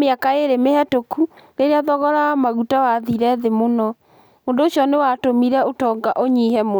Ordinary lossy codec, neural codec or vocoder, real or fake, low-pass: none; none; real; none